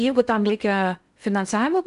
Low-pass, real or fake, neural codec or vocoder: 10.8 kHz; fake; codec, 16 kHz in and 24 kHz out, 0.8 kbps, FocalCodec, streaming, 65536 codes